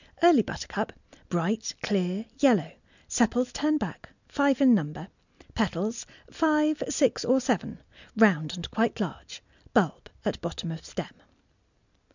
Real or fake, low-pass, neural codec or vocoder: real; 7.2 kHz; none